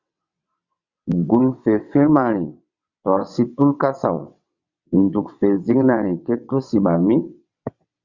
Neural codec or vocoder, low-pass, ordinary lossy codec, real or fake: vocoder, 22.05 kHz, 80 mel bands, WaveNeXt; 7.2 kHz; Opus, 64 kbps; fake